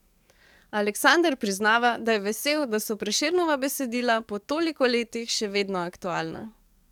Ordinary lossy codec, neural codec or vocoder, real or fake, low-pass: none; codec, 44.1 kHz, 7.8 kbps, DAC; fake; 19.8 kHz